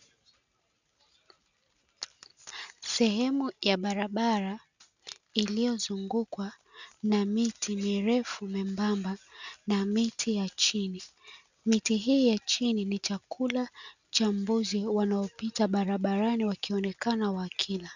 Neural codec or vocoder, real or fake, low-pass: none; real; 7.2 kHz